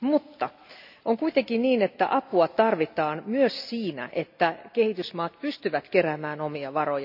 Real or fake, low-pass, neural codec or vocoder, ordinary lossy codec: real; 5.4 kHz; none; AAC, 48 kbps